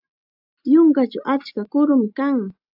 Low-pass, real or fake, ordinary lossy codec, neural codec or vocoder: 5.4 kHz; real; AAC, 48 kbps; none